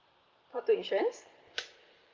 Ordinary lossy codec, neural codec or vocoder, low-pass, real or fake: Opus, 32 kbps; vocoder, 44.1 kHz, 128 mel bands every 512 samples, BigVGAN v2; 7.2 kHz; fake